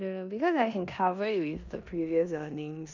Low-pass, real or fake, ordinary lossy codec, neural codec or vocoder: 7.2 kHz; fake; none; codec, 16 kHz in and 24 kHz out, 0.9 kbps, LongCat-Audio-Codec, four codebook decoder